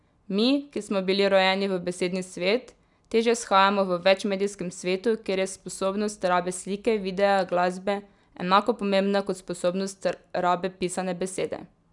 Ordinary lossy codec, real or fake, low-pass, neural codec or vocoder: none; real; 10.8 kHz; none